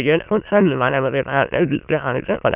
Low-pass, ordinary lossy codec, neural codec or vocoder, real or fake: 3.6 kHz; none; autoencoder, 22.05 kHz, a latent of 192 numbers a frame, VITS, trained on many speakers; fake